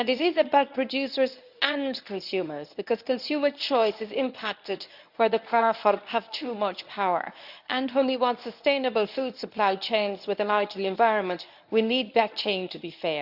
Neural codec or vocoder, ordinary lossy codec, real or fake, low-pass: codec, 24 kHz, 0.9 kbps, WavTokenizer, medium speech release version 1; none; fake; 5.4 kHz